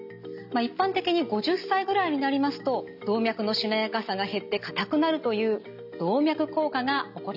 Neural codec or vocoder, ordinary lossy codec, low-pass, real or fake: none; none; 5.4 kHz; real